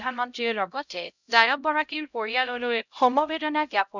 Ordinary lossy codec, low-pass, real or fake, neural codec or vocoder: none; 7.2 kHz; fake; codec, 16 kHz, 0.5 kbps, X-Codec, HuBERT features, trained on LibriSpeech